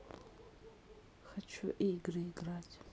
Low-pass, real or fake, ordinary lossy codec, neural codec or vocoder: none; real; none; none